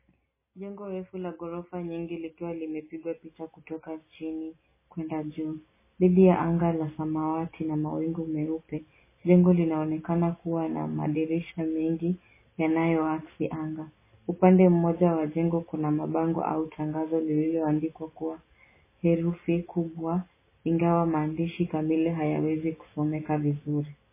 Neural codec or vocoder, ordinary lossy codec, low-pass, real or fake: none; MP3, 16 kbps; 3.6 kHz; real